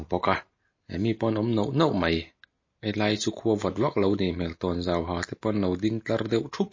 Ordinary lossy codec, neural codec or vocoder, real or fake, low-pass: MP3, 32 kbps; none; real; 7.2 kHz